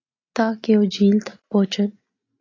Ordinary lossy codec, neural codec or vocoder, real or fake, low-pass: AAC, 32 kbps; none; real; 7.2 kHz